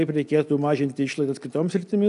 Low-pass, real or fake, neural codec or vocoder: 10.8 kHz; real; none